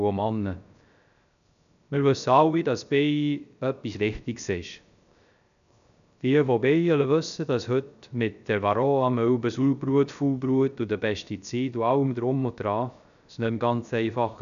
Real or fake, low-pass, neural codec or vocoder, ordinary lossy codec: fake; 7.2 kHz; codec, 16 kHz, 0.3 kbps, FocalCodec; none